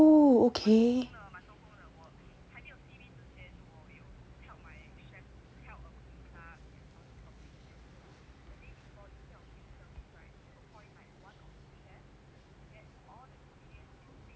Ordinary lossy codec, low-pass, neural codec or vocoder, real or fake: none; none; none; real